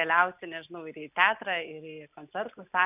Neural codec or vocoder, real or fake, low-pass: none; real; 3.6 kHz